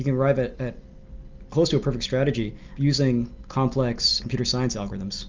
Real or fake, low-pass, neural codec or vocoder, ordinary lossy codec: real; 7.2 kHz; none; Opus, 32 kbps